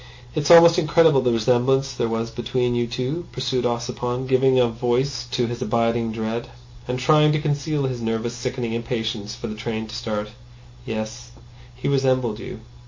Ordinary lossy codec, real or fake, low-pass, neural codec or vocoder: MP3, 32 kbps; real; 7.2 kHz; none